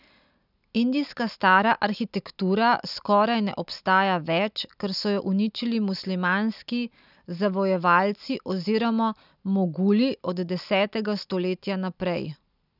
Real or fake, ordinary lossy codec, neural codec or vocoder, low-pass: real; none; none; 5.4 kHz